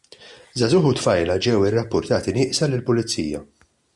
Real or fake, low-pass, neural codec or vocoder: real; 10.8 kHz; none